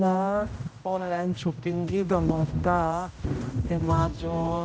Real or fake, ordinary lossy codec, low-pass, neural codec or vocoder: fake; none; none; codec, 16 kHz, 0.5 kbps, X-Codec, HuBERT features, trained on general audio